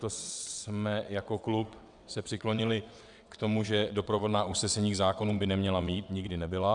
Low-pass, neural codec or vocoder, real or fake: 9.9 kHz; vocoder, 22.05 kHz, 80 mel bands, WaveNeXt; fake